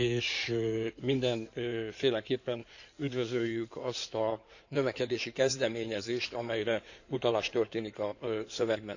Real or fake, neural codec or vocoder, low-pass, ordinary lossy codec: fake; codec, 16 kHz in and 24 kHz out, 2.2 kbps, FireRedTTS-2 codec; 7.2 kHz; none